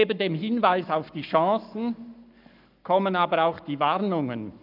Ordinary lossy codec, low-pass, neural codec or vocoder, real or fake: none; 5.4 kHz; codec, 44.1 kHz, 7.8 kbps, Pupu-Codec; fake